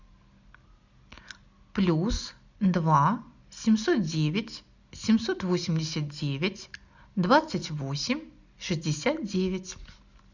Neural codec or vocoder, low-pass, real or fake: none; 7.2 kHz; real